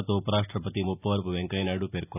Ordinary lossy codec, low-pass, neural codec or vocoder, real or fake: none; 3.6 kHz; none; real